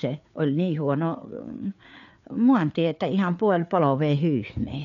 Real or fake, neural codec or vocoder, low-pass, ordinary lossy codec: fake; codec, 16 kHz, 4 kbps, FreqCodec, larger model; 7.2 kHz; none